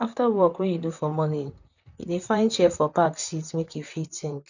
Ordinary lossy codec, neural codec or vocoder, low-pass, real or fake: none; vocoder, 44.1 kHz, 128 mel bands, Pupu-Vocoder; 7.2 kHz; fake